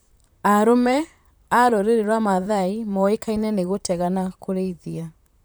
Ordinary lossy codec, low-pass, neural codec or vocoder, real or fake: none; none; vocoder, 44.1 kHz, 128 mel bands, Pupu-Vocoder; fake